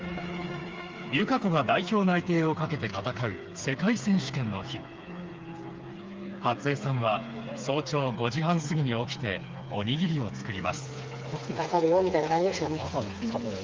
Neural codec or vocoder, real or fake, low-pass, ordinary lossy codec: codec, 16 kHz, 4 kbps, FreqCodec, smaller model; fake; 7.2 kHz; Opus, 32 kbps